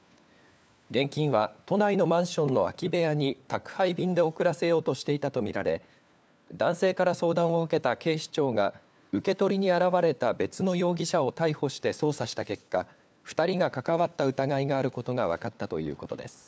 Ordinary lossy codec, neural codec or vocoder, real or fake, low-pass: none; codec, 16 kHz, 4 kbps, FunCodec, trained on LibriTTS, 50 frames a second; fake; none